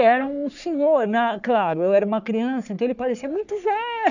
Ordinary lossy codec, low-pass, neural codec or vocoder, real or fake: none; 7.2 kHz; codec, 44.1 kHz, 3.4 kbps, Pupu-Codec; fake